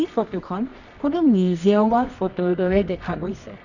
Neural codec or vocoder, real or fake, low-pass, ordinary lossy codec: codec, 24 kHz, 0.9 kbps, WavTokenizer, medium music audio release; fake; 7.2 kHz; none